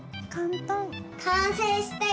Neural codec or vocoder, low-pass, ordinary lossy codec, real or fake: none; none; none; real